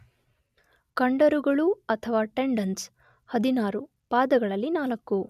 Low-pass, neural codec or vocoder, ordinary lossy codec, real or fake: 14.4 kHz; none; none; real